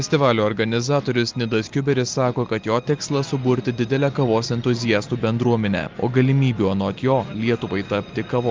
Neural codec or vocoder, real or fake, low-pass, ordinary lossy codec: none; real; 7.2 kHz; Opus, 32 kbps